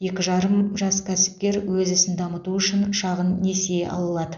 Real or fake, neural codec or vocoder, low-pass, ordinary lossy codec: real; none; 7.2 kHz; none